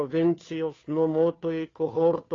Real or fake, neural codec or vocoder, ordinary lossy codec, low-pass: fake; codec, 16 kHz, 2 kbps, FunCodec, trained on Chinese and English, 25 frames a second; AAC, 32 kbps; 7.2 kHz